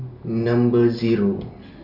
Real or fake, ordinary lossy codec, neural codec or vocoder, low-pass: real; none; none; 5.4 kHz